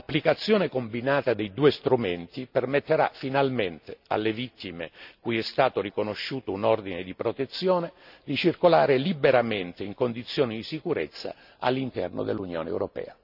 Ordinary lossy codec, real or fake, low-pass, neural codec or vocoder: none; real; 5.4 kHz; none